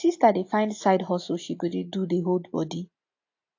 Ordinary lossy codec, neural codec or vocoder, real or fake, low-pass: AAC, 48 kbps; none; real; 7.2 kHz